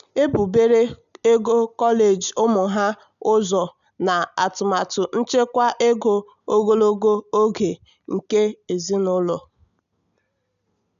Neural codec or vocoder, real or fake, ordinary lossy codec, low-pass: none; real; none; 7.2 kHz